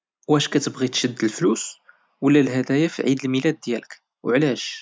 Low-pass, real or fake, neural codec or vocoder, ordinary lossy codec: none; real; none; none